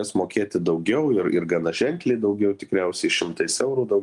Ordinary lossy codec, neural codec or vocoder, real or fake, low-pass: Opus, 32 kbps; none; real; 10.8 kHz